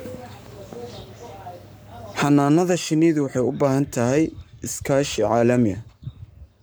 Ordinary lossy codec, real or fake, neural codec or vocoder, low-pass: none; fake; codec, 44.1 kHz, 7.8 kbps, Pupu-Codec; none